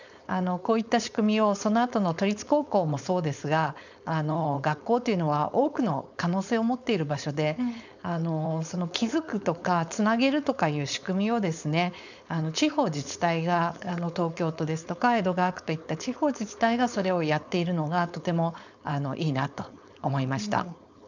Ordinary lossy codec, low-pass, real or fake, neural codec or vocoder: none; 7.2 kHz; fake; codec, 16 kHz, 4.8 kbps, FACodec